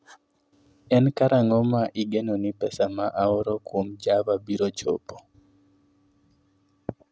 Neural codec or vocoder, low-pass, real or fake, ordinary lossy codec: none; none; real; none